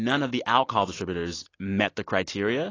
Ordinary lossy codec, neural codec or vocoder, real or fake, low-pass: AAC, 32 kbps; none; real; 7.2 kHz